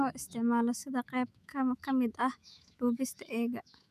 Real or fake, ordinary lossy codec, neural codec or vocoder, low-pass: fake; none; autoencoder, 48 kHz, 128 numbers a frame, DAC-VAE, trained on Japanese speech; 14.4 kHz